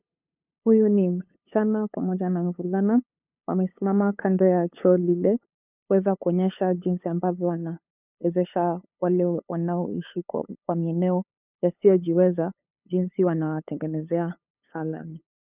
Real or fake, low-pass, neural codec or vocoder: fake; 3.6 kHz; codec, 16 kHz, 8 kbps, FunCodec, trained on LibriTTS, 25 frames a second